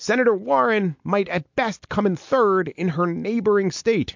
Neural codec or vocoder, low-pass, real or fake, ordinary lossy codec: none; 7.2 kHz; real; MP3, 48 kbps